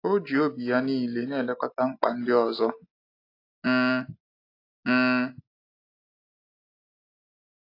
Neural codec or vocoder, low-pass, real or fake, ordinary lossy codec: none; 5.4 kHz; real; AAC, 32 kbps